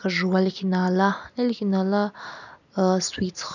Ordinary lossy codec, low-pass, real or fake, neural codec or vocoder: none; 7.2 kHz; real; none